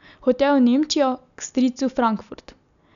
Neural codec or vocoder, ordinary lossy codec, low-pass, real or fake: none; none; 7.2 kHz; real